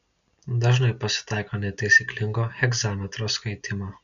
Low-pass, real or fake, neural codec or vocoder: 7.2 kHz; real; none